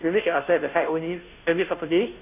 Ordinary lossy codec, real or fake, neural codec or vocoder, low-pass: none; fake; codec, 16 kHz, 0.5 kbps, FunCodec, trained on Chinese and English, 25 frames a second; 3.6 kHz